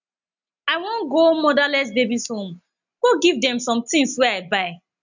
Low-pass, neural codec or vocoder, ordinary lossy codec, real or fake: 7.2 kHz; none; none; real